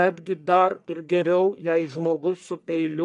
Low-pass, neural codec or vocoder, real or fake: 10.8 kHz; codec, 44.1 kHz, 1.7 kbps, Pupu-Codec; fake